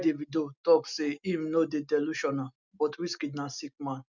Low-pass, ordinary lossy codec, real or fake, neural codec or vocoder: 7.2 kHz; none; real; none